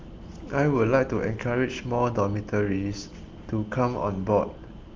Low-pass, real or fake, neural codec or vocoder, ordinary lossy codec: 7.2 kHz; real; none; Opus, 32 kbps